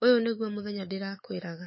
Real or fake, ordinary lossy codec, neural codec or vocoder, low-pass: fake; MP3, 24 kbps; autoencoder, 48 kHz, 128 numbers a frame, DAC-VAE, trained on Japanese speech; 7.2 kHz